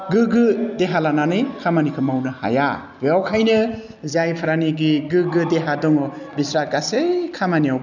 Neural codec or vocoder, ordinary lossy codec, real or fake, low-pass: none; none; real; 7.2 kHz